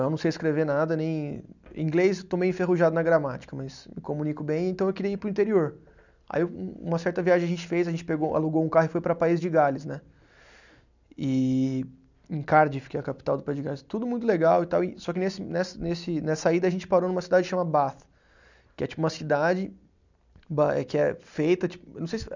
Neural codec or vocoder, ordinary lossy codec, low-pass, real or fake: none; none; 7.2 kHz; real